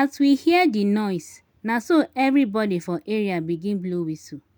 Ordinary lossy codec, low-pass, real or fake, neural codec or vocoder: none; 19.8 kHz; real; none